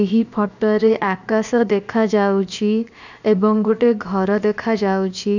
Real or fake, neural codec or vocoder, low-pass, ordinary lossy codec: fake; codec, 16 kHz, 0.7 kbps, FocalCodec; 7.2 kHz; none